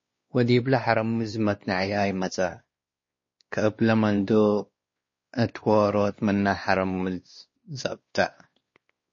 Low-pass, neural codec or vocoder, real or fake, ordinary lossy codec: 7.2 kHz; codec, 16 kHz, 2 kbps, X-Codec, WavLM features, trained on Multilingual LibriSpeech; fake; MP3, 32 kbps